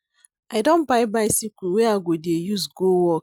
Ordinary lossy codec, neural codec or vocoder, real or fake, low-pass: none; none; real; 19.8 kHz